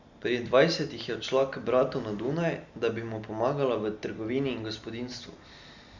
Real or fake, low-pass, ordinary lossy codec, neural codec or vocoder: real; 7.2 kHz; Opus, 64 kbps; none